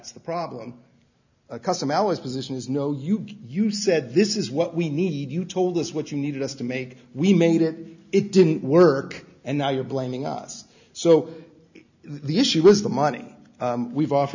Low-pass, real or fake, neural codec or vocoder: 7.2 kHz; real; none